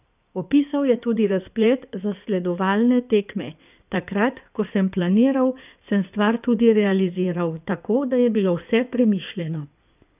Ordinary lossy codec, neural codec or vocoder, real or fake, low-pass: none; codec, 16 kHz in and 24 kHz out, 2.2 kbps, FireRedTTS-2 codec; fake; 3.6 kHz